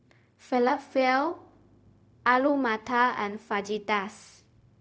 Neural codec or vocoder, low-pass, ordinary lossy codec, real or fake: codec, 16 kHz, 0.4 kbps, LongCat-Audio-Codec; none; none; fake